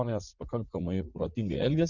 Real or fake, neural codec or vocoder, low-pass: fake; vocoder, 44.1 kHz, 80 mel bands, Vocos; 7.2 kHz